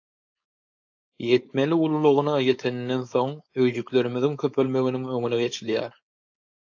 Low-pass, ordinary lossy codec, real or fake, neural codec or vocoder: 7.2 kHz; AAC, 48 kbps; fake; codec, 16 kHz, 4.8 kbps, FACodec